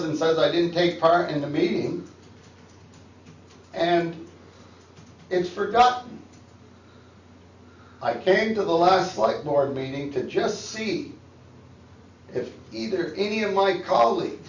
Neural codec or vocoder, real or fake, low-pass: none; real; 7.2 kHz